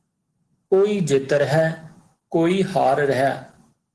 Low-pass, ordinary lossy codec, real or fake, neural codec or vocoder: 10.8 kHz; Opus, 16 kbps; real; none